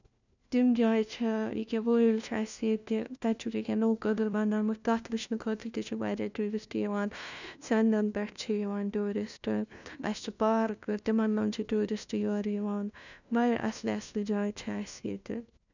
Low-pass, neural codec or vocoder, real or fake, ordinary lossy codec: 7.2 kHz; codec, 16 kHz, 1 kbps, FunCodec, trained on LibriTTS, 50 frames a second; fake; none